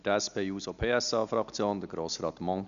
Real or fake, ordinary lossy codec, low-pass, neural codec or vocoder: real; none; 7.2 kHz; none